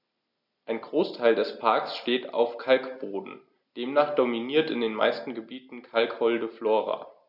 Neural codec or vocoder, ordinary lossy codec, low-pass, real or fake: none; none; 5.4 kHz; real